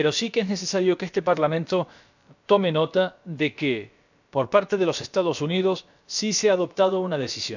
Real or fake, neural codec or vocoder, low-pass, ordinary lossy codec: fake; codec, 16 kHz, about 1 kbps, DyCAST, with the encoder's durations; 7.2 kHz; none